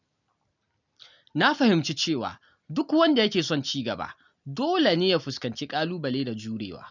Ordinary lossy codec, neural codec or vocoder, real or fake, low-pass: none; none; real; 7.2 kHz